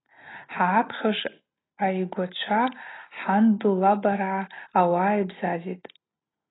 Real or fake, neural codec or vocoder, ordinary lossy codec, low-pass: real; none; AAC, 16 kbps; 7.2 kHz